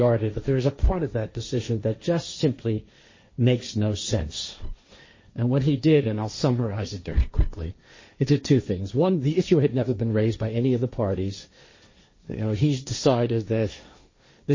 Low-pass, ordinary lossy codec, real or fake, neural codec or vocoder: 7.2 kHz; MP3, 32 kbps; fake; codec, 16 kHz, 1.1 kbps, Voila-Tokenizer